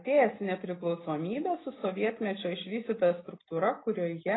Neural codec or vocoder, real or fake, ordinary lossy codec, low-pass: none; real; AAC, 16 kbps; 7.2 kHz